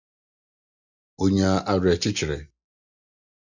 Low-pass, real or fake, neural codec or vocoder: 7.2 kHz; real; none